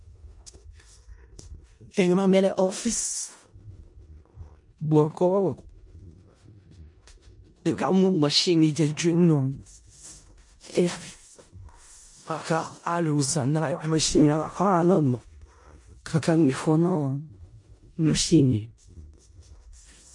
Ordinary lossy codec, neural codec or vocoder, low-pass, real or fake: MP3, 48 kbps; codec, 16 kHz in and 24 kHz out, 0.4 kbps, LongCat-Audio-Codec, four codebook decoder; 10.8 kHz; fake